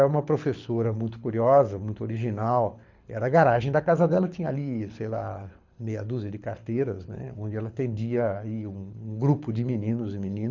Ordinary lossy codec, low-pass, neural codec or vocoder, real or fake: none; 7.2 kHz; codec, 24 kHz, 6 kbps, HILCodec; fake